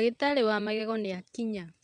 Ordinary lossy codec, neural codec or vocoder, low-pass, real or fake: none; vocoder, 22.05 kHz, 80 mel bands, WaveNeXt; 9.9 kHz; fake